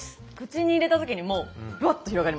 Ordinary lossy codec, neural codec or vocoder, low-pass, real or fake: none; none; none; real